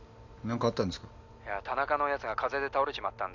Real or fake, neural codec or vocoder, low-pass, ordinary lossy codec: real; none; 7.2 kHz; none